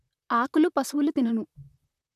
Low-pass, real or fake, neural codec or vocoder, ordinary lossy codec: 14.4 kHz; fake; vocoder, 44.1 kHz, 128 mel bands, Pupu-Vocoder; none